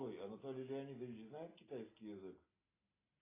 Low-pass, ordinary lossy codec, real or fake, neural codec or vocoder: 3.6 kHz; AAC, 24 kbps; real; none